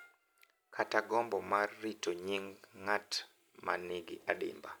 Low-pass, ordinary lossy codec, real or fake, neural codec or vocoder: none; none; real; none